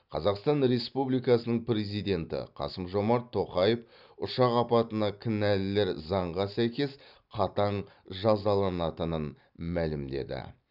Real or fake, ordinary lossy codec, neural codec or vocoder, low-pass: fake; none; vocoder, 44.1 kHz, 128 mel bands every 256 samples, BigVGAN v2; 5.4 kHz